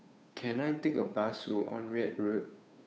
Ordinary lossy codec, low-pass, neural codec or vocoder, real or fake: none; none; codec, 16 kHz, 2 kbps, FunCodec, trained on Chinese and English, 25 frames a second; fake